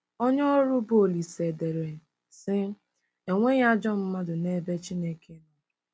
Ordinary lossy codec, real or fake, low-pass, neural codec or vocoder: none; real; none; none